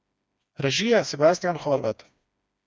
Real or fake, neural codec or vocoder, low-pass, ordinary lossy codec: fake; codec, 16 kHz, 2 kbps, FreqCodec, smaller model; none; none